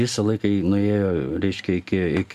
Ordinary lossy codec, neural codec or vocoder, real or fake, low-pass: AAC, 96 kbps; none; real; 14.4 kHz